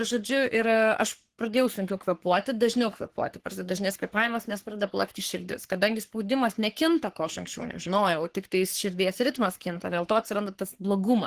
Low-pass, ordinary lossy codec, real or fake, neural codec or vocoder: 14.4 kHz; Opus, 16 kbps; fake; codec, 44.1 kHz, 3.4 kbps, Pupu-Codec